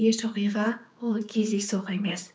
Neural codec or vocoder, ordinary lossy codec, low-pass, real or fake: codec, 16 kHz, 4 kbps, X-Codec, HuBERT features, trained on general audio; none; none; fake